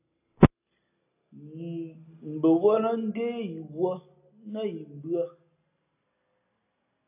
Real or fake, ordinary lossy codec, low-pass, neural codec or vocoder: real; AAC, 24 kbps; 3.6 kHz; none